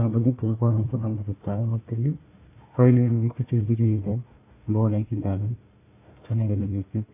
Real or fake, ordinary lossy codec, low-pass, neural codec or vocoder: fake; AAC, 24 kbps; 3.6 kHz; codec, 16 kHz, 1 kbps, FunCodec, trained on Chinese and English, 50 frames a second